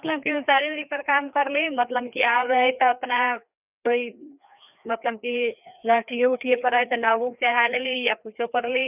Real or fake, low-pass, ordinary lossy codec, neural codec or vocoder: fake; 3.6 kHz; none; codec, 16 kHz, 2 kbps, FreqCodec, larger model